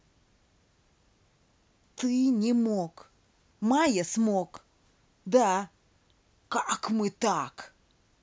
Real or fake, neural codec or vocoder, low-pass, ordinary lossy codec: real; none; none; none